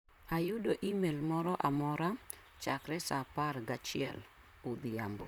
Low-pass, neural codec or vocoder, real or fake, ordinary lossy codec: 19.8 kHz; vocoder, 44.1 kHz, 128 mel bands, Pupu-Vocoder; fake; none